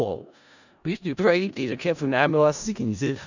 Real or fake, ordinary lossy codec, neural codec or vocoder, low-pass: fake; none; codec, 16 kHz in and 24 kHz out, 0.4 kbps, LongCat-Audio-Codec, four codebook decoder; 7.2 kHz